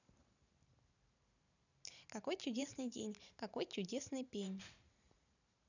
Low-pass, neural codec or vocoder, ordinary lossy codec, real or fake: 7.2 kHz; vocoder, 44.1 kHz, 128 mel bands every 512 samples, BigVGAN v2; none; fake